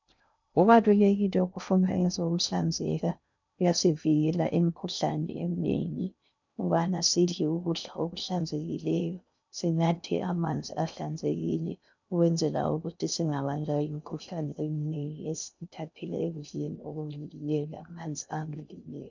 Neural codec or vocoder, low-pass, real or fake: codec, 16 kHz in and 24 kHz out, 0.6 kbps, FocalCodec, streaming, 2048 codes; 7.2 kHz; fake